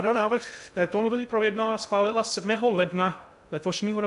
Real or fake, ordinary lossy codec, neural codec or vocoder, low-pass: fake; AAC, 96 kbps; codec, 16 kHz in and 24 kHz out, 0.6 kbps, FocalCodec, streaming, 2048 codes; 10.8 kHz